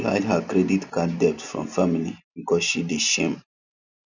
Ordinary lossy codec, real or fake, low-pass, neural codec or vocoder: none; real; 7.2 kHz; none